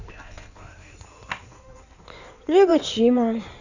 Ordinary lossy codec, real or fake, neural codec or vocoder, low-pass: none; fake; codec, 16 kHz in and 24 kHz out, 2.2 kbps, FireRedTTS-2 codec; 7.2 kHz